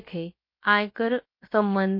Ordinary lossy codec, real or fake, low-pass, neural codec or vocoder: MP3, 32 kbps; fake; 5.4 kHz; codec, 16 kHz, about 1 kbps, DyCAST, with the encoder's durations